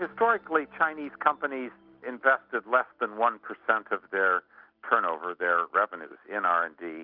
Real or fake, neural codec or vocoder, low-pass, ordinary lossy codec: real; none; 5.4 kHz; Opus, 32 kbps